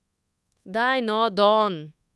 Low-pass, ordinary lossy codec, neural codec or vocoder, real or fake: none; none; codec, 24 kHz, 1.2 kbps, DualCodec; fake